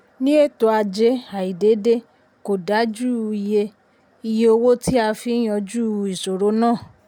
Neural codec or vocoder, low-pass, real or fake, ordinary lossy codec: none; 19.8 kHz; real; none